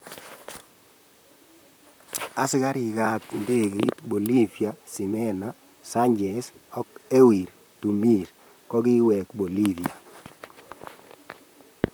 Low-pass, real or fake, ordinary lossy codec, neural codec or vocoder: none; fake; none; vocoder, 44.1 kHz, 128 mel bands, Pupu-Vocoder